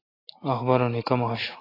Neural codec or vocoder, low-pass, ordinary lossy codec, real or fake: none; 5.4 kHz; AAC, 24 kbps; real